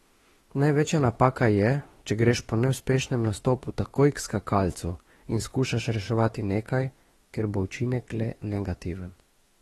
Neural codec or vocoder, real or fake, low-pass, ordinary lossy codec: autoencoder, 48 kHz, 32 numbers a frame, DAC-VAE, trained on Japanese speech; fake; 19.8 kHz; AAC, 32 kbps